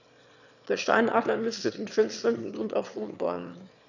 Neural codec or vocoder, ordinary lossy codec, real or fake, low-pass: autoencoder, 22.05 kHz, a latent of 192 numbers a frame, VITS, trained on one speaker; none; fake; 7.2 kHz